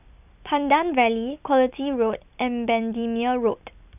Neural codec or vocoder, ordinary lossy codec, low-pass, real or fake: none; none; 3.6 kHz; real